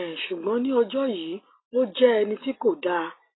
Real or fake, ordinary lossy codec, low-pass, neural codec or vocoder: real; AAC, 16 kbps; 7.2 kHz; none